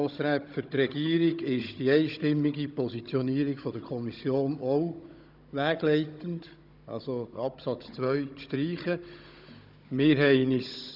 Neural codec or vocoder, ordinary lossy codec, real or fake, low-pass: codec, 16 kHz, 16 kbps, FunCodec, trained on Chinese and English, 50 frames a second; none; fake; 5.4 kHz